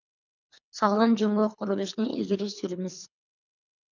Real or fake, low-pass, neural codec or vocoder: fake; 7.2 kHz; codec, 24 kHz, 3 kbps, HILCodec